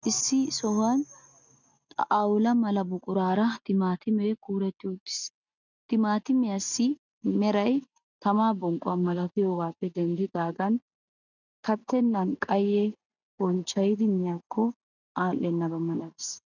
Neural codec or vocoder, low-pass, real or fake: none; 7.2 kHz; real